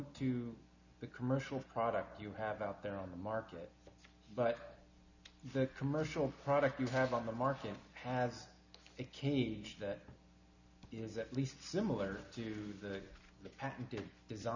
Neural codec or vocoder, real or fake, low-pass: none; real; 7.2 kHz